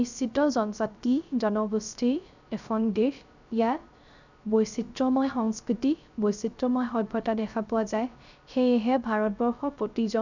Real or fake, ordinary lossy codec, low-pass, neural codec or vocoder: fake; none; 7.2 kHz; codec, 16 kHz, 0.3 kbps, FocalCodec